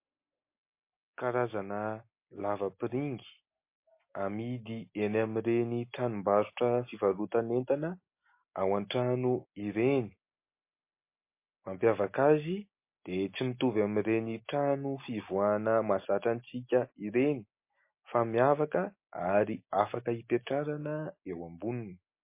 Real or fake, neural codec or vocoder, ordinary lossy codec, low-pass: real; none; MP3, 24 kbps; 3.6 kHz